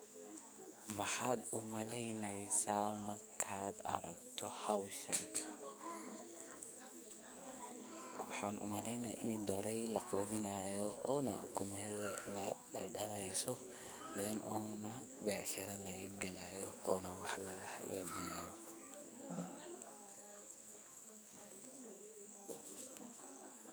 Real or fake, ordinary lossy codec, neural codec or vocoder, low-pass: fake; none; codec, 44.1 kHz, 2.6 kbps, SNAC; none